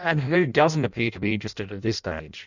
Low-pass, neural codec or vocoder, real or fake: 7.2 kHz; codec, 16 kHz in and 24 kHz out, 0.6 kbps, FireRedTTS-2 codec; fake